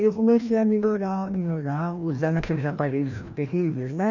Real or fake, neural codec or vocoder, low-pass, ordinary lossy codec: fake; codec, 16 kHz, 1 kbps, FreqCodec, larger model; 7.2 kHz; none